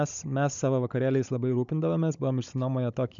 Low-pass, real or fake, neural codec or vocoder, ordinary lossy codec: 7.2 kHz; fake; codec, 16 kHz, 16 kbps, FunCodec, trained on Chinese and English, 50 frames a second; MP3, 96 kbps